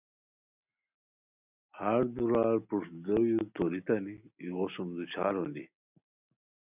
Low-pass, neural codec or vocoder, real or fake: 3.6 kHz; none; real